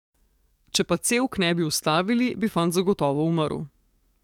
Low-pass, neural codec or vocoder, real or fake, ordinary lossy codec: 19.8 kHz; codec, 44.1 kHz, 7.8 kbps, DAC; fake; none